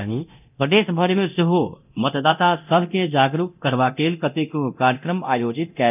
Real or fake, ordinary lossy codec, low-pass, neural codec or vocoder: fake; none; 3.6 kHz; codec, 24 kHz, 0.9 kbps, DualCodec